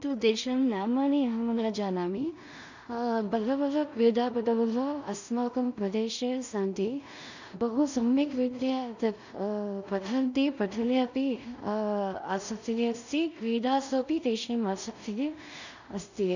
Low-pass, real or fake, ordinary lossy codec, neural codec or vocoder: 7.2 kHz; fake; none; codec, 16 kHz in and 24 kHz out, 0.4 kbps, LongCat-Audio-Codec, two codebook decoder